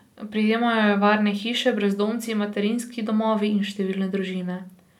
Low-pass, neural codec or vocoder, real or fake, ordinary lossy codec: 19.8 kHz; none; real; none